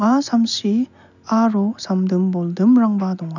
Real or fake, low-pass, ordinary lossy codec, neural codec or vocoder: real; 7.2 kHz; none; none